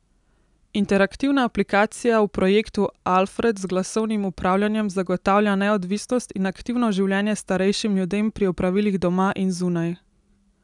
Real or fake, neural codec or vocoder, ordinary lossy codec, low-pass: real; none; none; 10.8 kHz